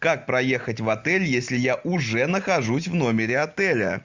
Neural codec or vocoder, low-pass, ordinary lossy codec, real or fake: none; 7.2 kHz; MP3, 64 kbps; real